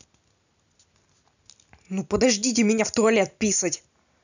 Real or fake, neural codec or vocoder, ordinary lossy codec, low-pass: real; none; none; 7.2 kHz